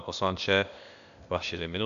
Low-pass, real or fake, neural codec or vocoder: 7.2 kHz; fake; codec, 16 kHz, 0.8 kbps, ZipCodec